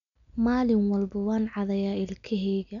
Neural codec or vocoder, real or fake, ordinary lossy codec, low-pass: none; real; none; 7.2 kHz